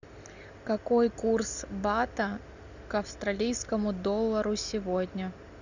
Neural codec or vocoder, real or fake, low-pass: none; real; 7.2 kHz